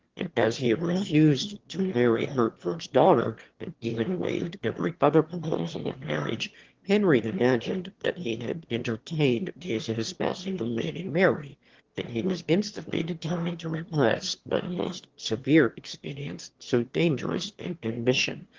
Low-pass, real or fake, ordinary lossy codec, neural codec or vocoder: 7.2 kHz; fake; Opus, 16 kbps; autoencoder, 22.05 kHz, a latent of 192 numbers a frame, VITS, trained on one speaker